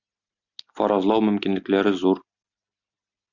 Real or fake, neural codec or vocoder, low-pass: real; none; 7.2 kHz